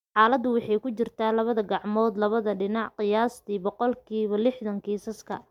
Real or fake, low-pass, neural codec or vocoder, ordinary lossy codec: real; 19.8 kHz; none; MP3, 96 kbps